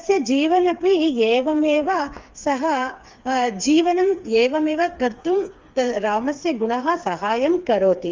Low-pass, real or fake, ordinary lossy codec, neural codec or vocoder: 7.2 kHz; fake; Opus, 32 kbps; codec, 16 kHz, 4 kbps, FreqCodec, smaller model